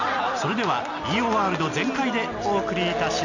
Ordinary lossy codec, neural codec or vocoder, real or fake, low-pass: none; none; real; 7.2 kHz